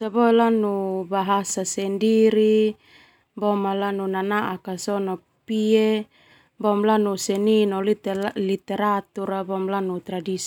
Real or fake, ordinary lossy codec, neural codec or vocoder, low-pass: real; none; none; 19.8 kHz